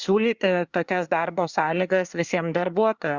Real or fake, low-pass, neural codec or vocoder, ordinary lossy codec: fake; 7.2 kHz; codec, 24 kHz, 1 kbps, SNAC; Opus, 64 kbps